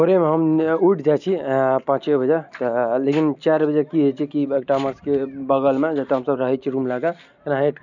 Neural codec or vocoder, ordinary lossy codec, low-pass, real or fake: none; none; 7.2 kHz; real